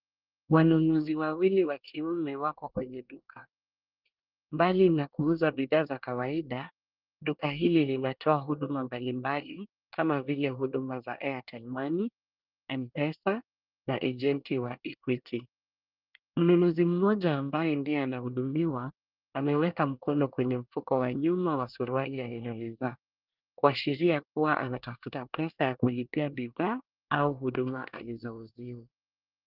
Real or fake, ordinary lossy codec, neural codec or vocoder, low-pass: fake; Opus, 24 kbps; codec, 24 kHz, 1 kbps, SNAC; 5.4 kHz